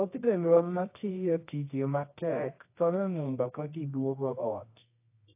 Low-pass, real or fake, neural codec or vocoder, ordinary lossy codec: 3.6 kHz; fake; codec, 24 kHz, 0.9 kbps, WavTokenizer, medium music audio release; AAC, 24 kbps